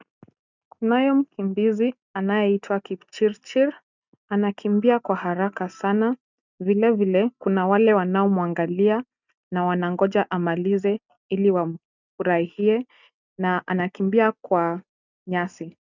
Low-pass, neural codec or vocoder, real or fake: 7.2 kHz; none; real